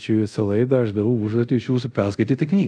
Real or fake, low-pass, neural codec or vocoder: fake; 9.9 kHz; codec, 24 kHz, 0.5 kbps, DualCodec